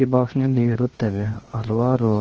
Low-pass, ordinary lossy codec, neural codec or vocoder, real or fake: 7.2 kHz; Opus, 16 kbps; codec, 16 kHz, 0.7 kbps, FocalCodec; fake